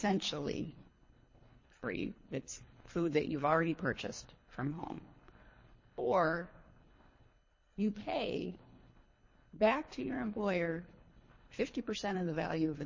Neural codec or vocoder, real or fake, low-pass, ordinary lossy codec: codec, 24 kHz, 3 kbps, HILCodec; fake; 7.2 kHz; MP3, 32 kbps